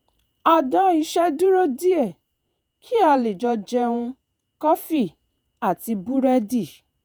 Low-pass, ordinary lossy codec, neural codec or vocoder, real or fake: none; none; vocoder, 48 kHz, 128 mel bands, Vocos; fake